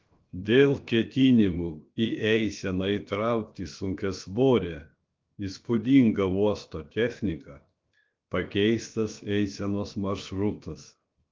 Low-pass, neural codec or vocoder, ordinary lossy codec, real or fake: 7.2 kHz; codec, 16 kHz, 0.7 kbps, FocalCodec; Opus, 32 kbps; fake